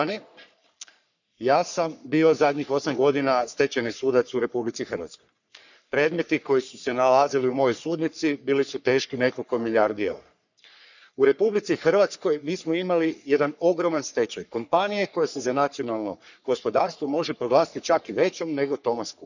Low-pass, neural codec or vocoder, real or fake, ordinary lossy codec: 7.2 kHz; codec, 44.1 kHz, 3.4 kbps, Pupu-Codec; fake; none